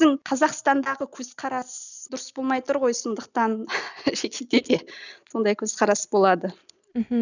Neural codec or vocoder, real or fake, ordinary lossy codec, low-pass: none; real; none; 7.2 kHz